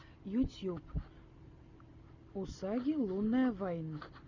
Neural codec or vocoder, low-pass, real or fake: none; 7.2 kHz; real